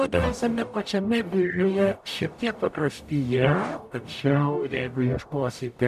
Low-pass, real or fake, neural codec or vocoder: 14.4 kHz; fake; codec, 44.1 kHz, 0.9 kbps, DAC